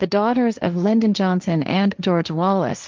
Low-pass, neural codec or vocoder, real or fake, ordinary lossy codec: 7.2 kHz; codec, 16 kHz, 1.1 kbps, Voila-Tokenizer; fake; Opus, 32 kbps